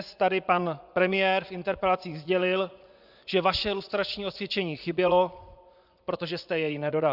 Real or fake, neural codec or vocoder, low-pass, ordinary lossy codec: fake; vocoder, 24 kHz, 100 mel bands, Vocos; 5.4 kHz; Opus, 64 kbps